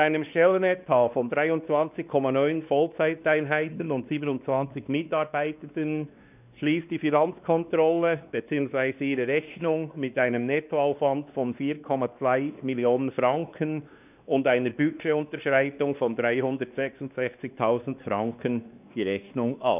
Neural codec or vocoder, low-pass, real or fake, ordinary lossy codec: codec, 16 kHz, 2 kbps, X-Codec, WavLM features, trained on Multilingual LibriSpeech; 3.6 kHz; fake; none